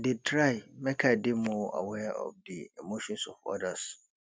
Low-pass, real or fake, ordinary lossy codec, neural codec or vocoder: none; real; none; none